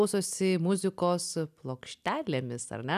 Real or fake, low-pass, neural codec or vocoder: real; 14.4 kHz; none